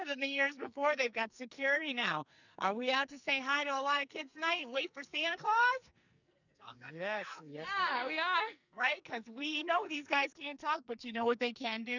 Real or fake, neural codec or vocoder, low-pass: fake; codec, 44.1 kHz, 2.6 kbps, SNAC; 7.2 kHz